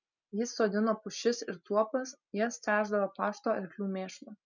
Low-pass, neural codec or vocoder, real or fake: 7.2 kHz; none; real